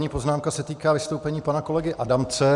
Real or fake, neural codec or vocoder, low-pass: real; none; 10.8 kHz